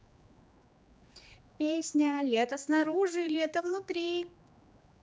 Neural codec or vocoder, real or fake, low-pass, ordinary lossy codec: codec, 16 kHz, 2 kbps, X-Codec, HuBERT features, trained on general audio; fake; none; none